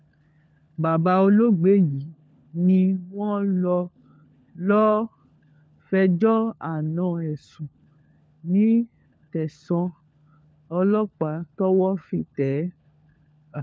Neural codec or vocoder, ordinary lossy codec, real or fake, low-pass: codec, 16 kHz, 4 kbps, FunCodec, trained on LibriTTS, 50 frames a second; none; fake; none